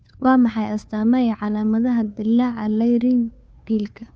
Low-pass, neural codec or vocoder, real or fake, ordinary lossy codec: none; codec, 16 kHz, 2 kbps, FunCodec, trained on Chinese and English, 25 frames a second; fake; none